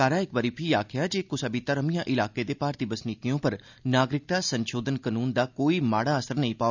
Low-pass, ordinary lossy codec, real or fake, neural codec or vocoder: 7.2 kHz; none; real; none